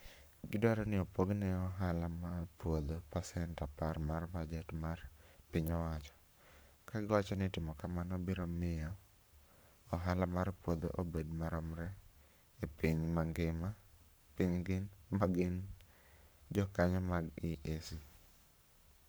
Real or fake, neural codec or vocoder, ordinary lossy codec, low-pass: fake; codec, 44.1 kHz, 7.8 kbps, Pupu-Codec; none; none